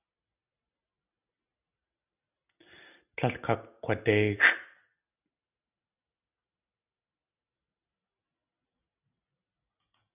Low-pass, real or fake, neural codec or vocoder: 3.6 kHz; real; none